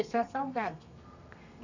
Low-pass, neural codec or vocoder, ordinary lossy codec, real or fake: 7.2 kHz; codec, 32 kHz, 1.9 kbps, SNAC; none; fake